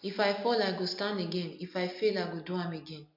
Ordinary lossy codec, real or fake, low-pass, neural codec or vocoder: MP3, 48 kbps; real; 5.4 kHz; none